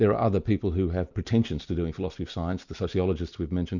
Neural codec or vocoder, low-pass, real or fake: none; 7.2 kHz; real